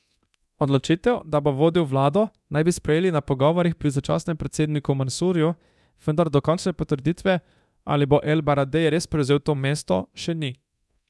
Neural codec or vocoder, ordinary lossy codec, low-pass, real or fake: codec, 24 kHz, 0.9 kbps, DualCodec; none; none; fake